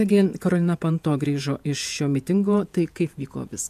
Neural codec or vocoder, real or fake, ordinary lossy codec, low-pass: vocoder, 48 kHz, 128 mel bands, Vocos; fake; AAC, 96 kbps; 14.4 kHz